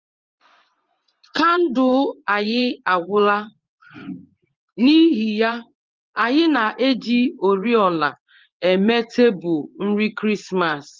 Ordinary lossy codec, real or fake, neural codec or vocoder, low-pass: Opus, 32 kbps; fake; vocoder, 24 kHz, 100 mel bands, Vocos; 7.2 kHz